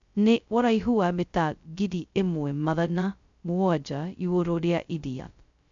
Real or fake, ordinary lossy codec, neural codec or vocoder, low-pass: fake; MP3, 64 kbps; codec, 16 kHz, 0.2 kbps, FocalCodec; 7.2 kHz